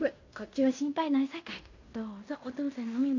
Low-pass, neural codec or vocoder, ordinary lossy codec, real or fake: 7.2 kHz; codec, 16 kHz in and 24 kHz out, 0.9 kbps, LongCat-Audio-Codec, fine tuned four codebook decoder; AAC, 48 kbps; fake